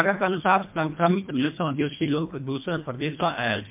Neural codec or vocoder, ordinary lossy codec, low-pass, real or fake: codec, 24 kHz, 1.5 kbps, HILCodec; MP3, 32 kbps; 3.6 kHz; fake